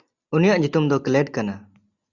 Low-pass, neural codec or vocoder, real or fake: 7.2 kHz; none; real